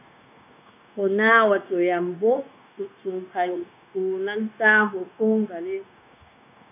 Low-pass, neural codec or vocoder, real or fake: 3.6 kHz; codec, 16 kHz, 0.9 kbps, LongCat-Audio-Codec; fake